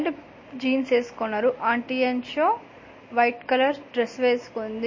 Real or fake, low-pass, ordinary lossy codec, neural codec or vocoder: real; 7.2 kHz; MP3, 32 kbps; none